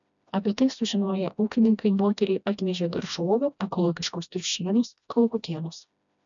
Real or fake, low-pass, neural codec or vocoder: fake; 7.2 kHz; codec, 16 kHz, 1 kbps, FreqCodec, smaller model